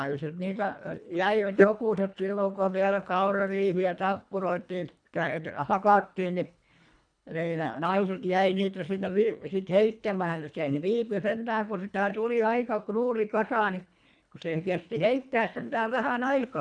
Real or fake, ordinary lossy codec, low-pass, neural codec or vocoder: fake; none; 9.9 kHz; codec, 24 kHz, 1.5 kbps, HILCodec